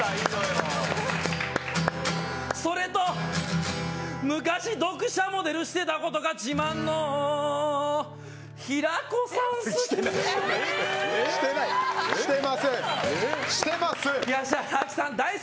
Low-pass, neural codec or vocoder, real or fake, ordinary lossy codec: none; none; real; none